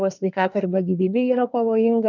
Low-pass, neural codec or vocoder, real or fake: 7.2 kHz; codec, 24 kHz, 1 kbps, SNAC; fake